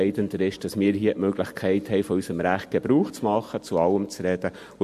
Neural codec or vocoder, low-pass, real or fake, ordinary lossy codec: none; 14.4 kHz; real; MP3, 64 kbps